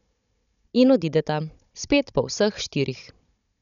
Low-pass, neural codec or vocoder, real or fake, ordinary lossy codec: 7.2 kHz; codec, 16 kHz, 16 kbps, FunCodec, trained on Chinese and English, 50 frames a second; fake; none